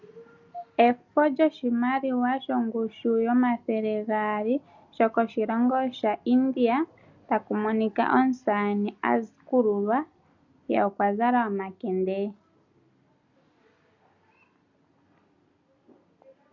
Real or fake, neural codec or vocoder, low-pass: real; none; 7.2 kHz